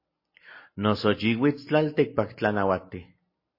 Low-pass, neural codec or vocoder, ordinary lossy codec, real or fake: 5.4 kHz; none; MP3, 24 kbps; real